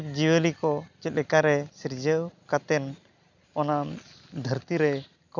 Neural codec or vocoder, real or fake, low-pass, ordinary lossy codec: none; real; 7.2 kHz; none